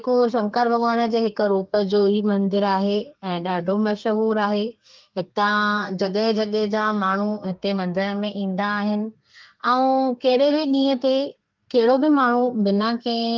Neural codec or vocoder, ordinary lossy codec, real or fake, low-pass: codec, 32 kHz, 1.9 kbps, SNAC; Opus, 24 kbps; fake; 7.2 kHz